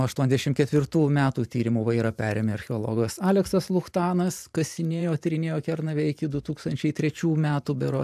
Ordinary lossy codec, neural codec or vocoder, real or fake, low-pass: AAC, 96 kbps; vocoder, 44.1 kHz, 128 mel bands every 512 samples, BigVGAN v2; fake; 14.4 kHz